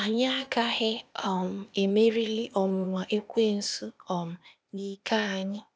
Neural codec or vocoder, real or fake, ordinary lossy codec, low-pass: codec, 16 kHz, 0.8 kbps, ZipCodec; fake; none; none